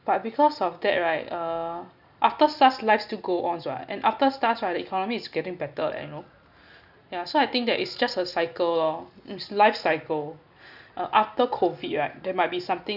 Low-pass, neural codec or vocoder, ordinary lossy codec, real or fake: 5.4 kHz; none; none; real